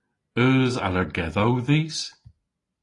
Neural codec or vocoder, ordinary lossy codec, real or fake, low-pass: none; AAC, 32 kbps; real; 10.8 kHz